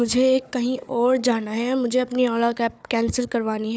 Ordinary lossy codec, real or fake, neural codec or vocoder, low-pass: none; fake; codec, 16 kHz, 4 kbps, FunCodec, trained on Chinese and English, 50 frames a second; none